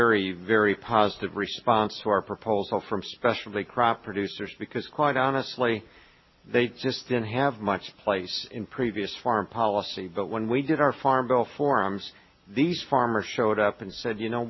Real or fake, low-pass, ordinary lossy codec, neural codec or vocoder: real; 7.2 kHz; MP3, 24 kbps; none